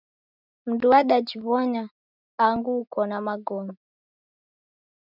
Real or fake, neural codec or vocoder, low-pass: real; none; 5.4 kHz